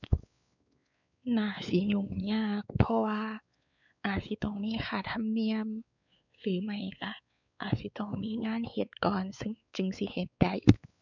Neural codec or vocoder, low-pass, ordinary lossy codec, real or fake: codec, 16 kHz, 4 kbps, X-Codec, WavLM features, trained on Multilingual LibriSpeech; 7.2 kHz; none; fake